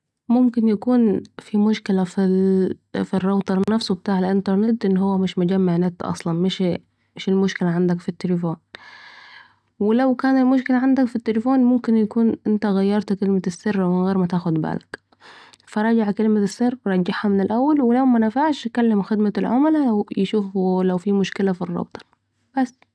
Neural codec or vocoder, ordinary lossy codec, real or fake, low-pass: none; none; real; none